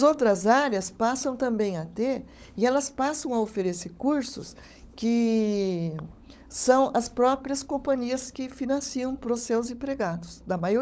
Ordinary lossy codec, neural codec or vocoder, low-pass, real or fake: none; codec, 16 kHz, 16 kbps, FunCodec, trained on LibriTTS, 50 frames a second; none; fake